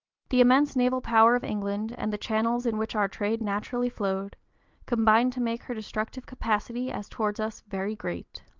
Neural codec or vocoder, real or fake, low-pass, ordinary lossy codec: none; real; 7.2 kHz; Opus, 32 kbps